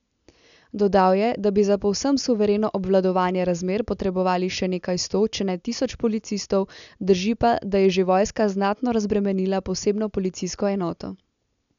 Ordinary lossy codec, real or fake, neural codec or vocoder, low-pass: none; real; none; 7.2 kHz